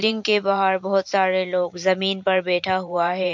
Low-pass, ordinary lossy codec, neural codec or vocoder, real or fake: 7.2 kHz; MP3, 64 kbps; vocoder, 44.1 kHz, 128 mel bands every 512 samples, BigVGAN v2; fake